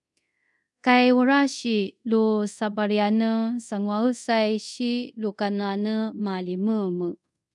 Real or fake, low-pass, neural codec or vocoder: fake; 10.8 kHz; codec, 24 kHz, 0.5 kbps, DualCodec